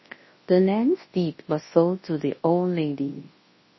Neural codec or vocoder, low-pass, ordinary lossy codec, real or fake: codec, 24 kHz, 0.9 kbps, WavTokenizer, large speech release; 7.2 kHz; MP3, 24 kbps; fake